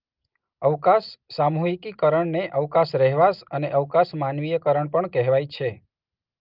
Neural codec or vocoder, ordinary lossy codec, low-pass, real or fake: none; Opus, 32 kbps; 5.4 kHz; real